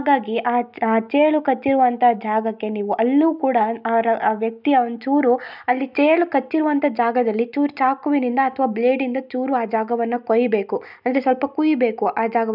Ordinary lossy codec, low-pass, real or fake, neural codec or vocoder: none; 5.4 kHz; real; none